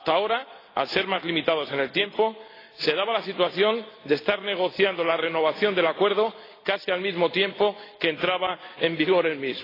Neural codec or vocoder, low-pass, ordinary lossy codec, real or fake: none; 5.4 kHz; AAC, 24 kbps; real